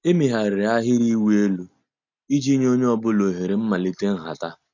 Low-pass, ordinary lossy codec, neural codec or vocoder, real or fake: 7.2 kHz; none; none; real